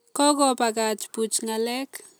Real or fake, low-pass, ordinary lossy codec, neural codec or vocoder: real; none; none; none